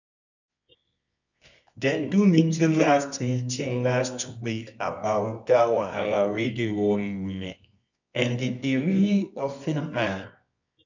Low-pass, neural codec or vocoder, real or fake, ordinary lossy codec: 7.2 kHz; codec, 24 kHz, 0.9 kbps, WavTokenizer, medium music audio release; fake; none